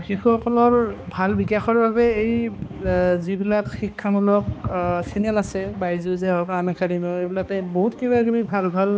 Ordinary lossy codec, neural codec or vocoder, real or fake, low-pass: none; codec, 16 kHz, 2 kbps, X-Codec, HuBERT features, trained on balanced general audio; fake; none